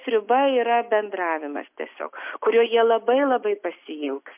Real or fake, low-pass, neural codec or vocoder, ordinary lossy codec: real; 3.6 kHz; none; MP3, 32 kbps